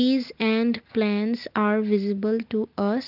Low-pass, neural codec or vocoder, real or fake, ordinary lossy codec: 5.4 kHz; none; real; Opus, 24 kbps